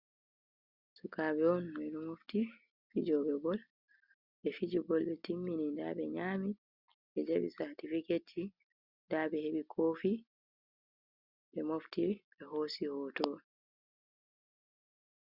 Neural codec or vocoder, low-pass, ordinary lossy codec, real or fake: none; 5.4 kHz; Opus, 64 kbps; real